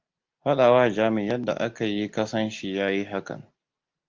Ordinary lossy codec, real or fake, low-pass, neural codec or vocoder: Opus, 32 kbps; real; 7.2 kHz; none